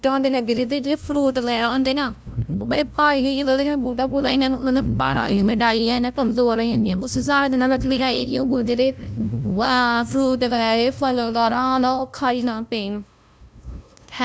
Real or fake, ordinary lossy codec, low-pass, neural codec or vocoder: fake; none; none; codec, 16 kHz, 0.5 kbps, FunCodec, trained on LibriTTS, 25 frames a second